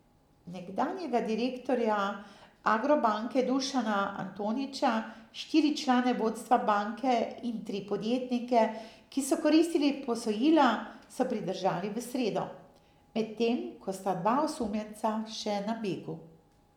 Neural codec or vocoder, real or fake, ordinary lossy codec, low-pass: none; real; none; 19.8 kHz